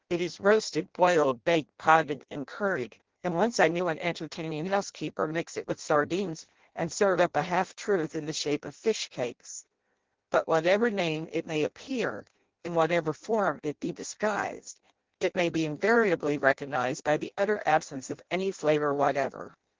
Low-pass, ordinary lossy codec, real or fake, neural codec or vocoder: 7.2 kHz; Opus, 16 kbps; fake; codec, 16 kHz in and 24 kHz out, 0.6 kbps, FireRedTTS-2 codec